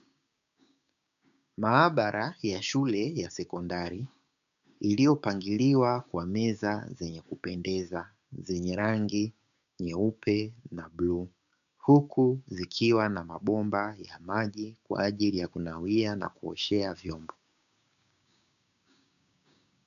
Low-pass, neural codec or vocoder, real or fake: 7.2 kHz; codec, 16 kHz, 6 kbps, DAC; fake